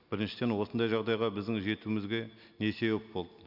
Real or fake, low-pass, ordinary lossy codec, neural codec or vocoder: real; 5.4 kHz; none; none